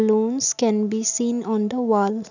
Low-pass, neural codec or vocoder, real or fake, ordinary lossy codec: 7.2 kHz; none; real; none